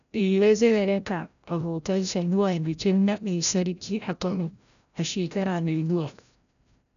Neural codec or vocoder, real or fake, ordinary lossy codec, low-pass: codec, 16 kHz, 0.5 kbps, FreqCodec, larger model; fake; none; 7.2 kHz